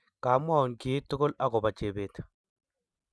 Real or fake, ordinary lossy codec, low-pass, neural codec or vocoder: real; none; none; none